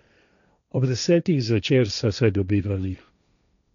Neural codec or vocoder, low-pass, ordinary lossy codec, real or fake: codec, 16 kHz, 1.1 kbps, Voila-Tokenizer; 7.2 kHz; none; fake